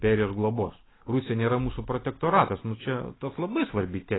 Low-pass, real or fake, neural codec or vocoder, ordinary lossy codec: 7.2 kHz; real; none; AAC, 16 kbps